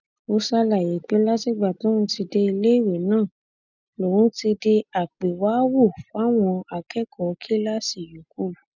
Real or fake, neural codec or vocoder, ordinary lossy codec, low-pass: real; none; none; 7.2 kHz